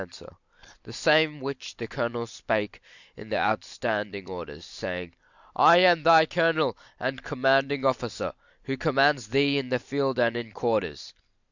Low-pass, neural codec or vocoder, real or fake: 7.2 kHz; none; real